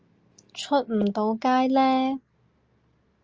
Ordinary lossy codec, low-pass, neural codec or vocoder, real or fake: Opus, 24 kbps; 7.2 kHz; none; real